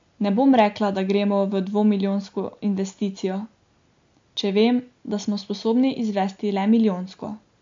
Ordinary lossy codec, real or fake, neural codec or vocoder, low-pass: MP3, 48 kbps; real; none; 7.2 kHz